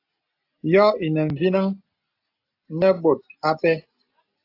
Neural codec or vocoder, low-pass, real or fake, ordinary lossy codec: none; 5.4 kHz; real; AAC, 32 kbps